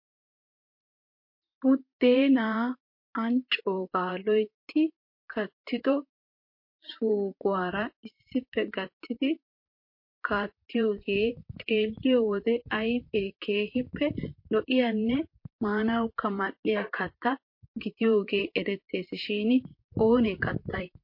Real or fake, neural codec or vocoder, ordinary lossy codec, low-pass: fake; vocoder, 44.1 kHz, 128 mel bands, Pupu-Vocoder; MP3, 32 kbps; 5.4 kHz